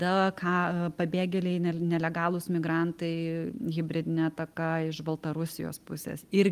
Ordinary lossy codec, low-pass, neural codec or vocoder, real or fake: Opus, 32 kbps; 14.4 kHz; none; real